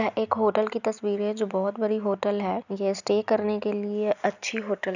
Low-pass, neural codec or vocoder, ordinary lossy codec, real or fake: 7.2 kHz; none; none; real